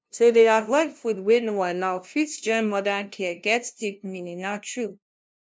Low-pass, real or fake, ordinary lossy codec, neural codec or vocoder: none; fake; none; codec, 16 kHz, 0.5 kbps, FunCodec, trained on LibriTTS, 25 frames a second